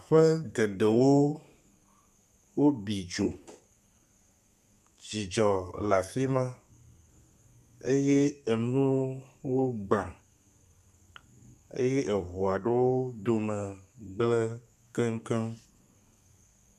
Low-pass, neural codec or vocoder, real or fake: 14.4 kHz; codec, 32 kHz, 1.9 kbps, SNAC; fake